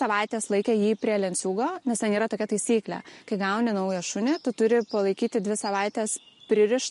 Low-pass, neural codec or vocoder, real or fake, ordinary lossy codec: 14.4 kHz; none; real; MP3, 48 kbps